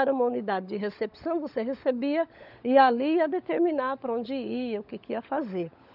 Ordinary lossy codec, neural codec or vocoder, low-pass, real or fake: none; codec, 16 kHz, 16 kbps, FunCodec, trained on LibriTTS, 50 frames a second; 5.4 kHz; fake